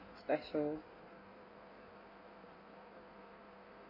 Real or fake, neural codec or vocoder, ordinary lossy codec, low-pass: fake; codec, 16 kHz in and 24 kHz out, 1.1 kbps, FireRedTTS-2 codec; none; 5.4 kHz